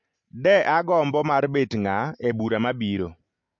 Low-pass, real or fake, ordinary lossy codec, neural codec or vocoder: 7.2 kHz; real; MP3, 48 kbps; none